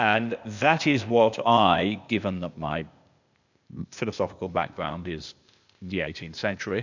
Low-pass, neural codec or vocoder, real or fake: 7.2 kHz; codec, 16 kHz, 0.8 kbps, ZipCodec; fake